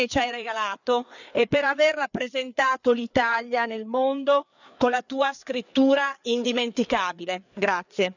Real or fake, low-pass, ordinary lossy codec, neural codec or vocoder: fake; 7.2 kHz; none; codec, 16 kHz, 4 kbps, FreqCodec, larger model